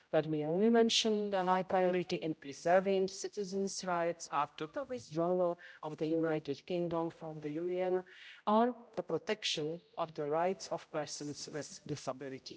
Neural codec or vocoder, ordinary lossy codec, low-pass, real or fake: codec, 16 kHz, 0.5 kbps, X-Codec, HuBERT features, trained on general audio; none; none; fake